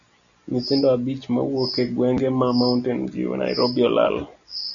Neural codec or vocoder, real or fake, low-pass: none; real; 7.2 kHz